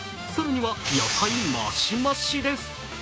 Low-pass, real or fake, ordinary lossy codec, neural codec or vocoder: none; fake; none; codec, 16 kHz, 6 kbps, DAC